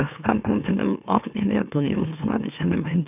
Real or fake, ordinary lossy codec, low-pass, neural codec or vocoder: fake; none; 3.6 kHz; autoencoder, 44.1 kHz, a latent of 192 numbers a frame, MeloTTS